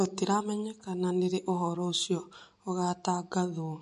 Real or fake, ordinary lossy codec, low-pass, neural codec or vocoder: real; MP3, 48 kbps; 14.4 kHz; none